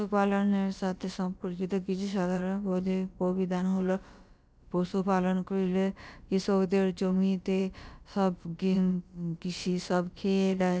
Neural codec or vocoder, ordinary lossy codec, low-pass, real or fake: codec, 16 kHz, about 1 kbps, DyCAST, with the encoder's durations; none; none; fake